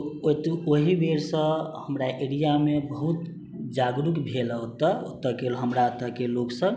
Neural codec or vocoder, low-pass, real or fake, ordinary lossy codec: none; none; real; none